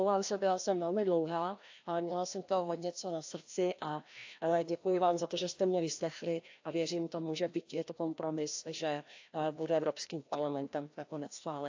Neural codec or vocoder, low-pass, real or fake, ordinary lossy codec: codec, 16 kHz, 1 kbps, FreqCodec, larger model; 7.2 kHz; fake; AAC, 48 kbps